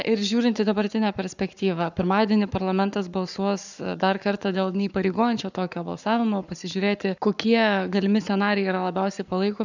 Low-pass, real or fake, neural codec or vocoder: 7.2 kHz; fake; codec, 44.1 kHz, 7.8 kbps, Pupu-Codec